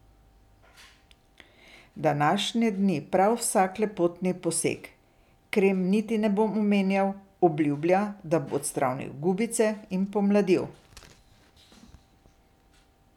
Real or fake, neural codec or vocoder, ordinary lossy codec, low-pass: real; none; none; 19.8 kHz